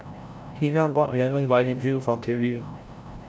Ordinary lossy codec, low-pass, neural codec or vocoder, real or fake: none; none; codec, 16 kHz, 0.5 kbps, FreqCodec, larger model; fake